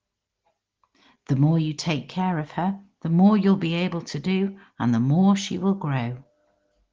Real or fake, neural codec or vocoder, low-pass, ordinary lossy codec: real; none; 7.2 kHz; Opus, 16 kbps